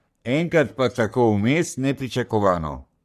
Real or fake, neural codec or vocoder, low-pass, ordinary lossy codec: fake; codec, 44.1 kHz, 3.4 kbps, Pupu-Codec; 14.4 kHz; none